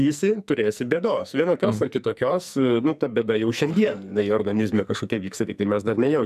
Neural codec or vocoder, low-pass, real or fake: codec, 44.1 kHz, 2.6 kbps, SNAC; 14.4 kHz; fake